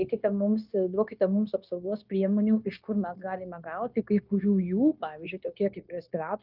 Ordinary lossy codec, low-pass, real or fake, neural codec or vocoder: Opus, 24 kbps; 5.4 kHz; fake; codec, 16 kHz, 0.9 kbps, LongCat-Audio-Codec